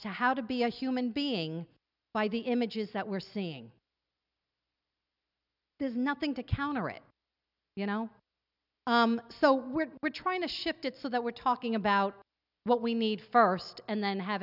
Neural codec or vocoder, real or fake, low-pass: none; real; 5.4 kHz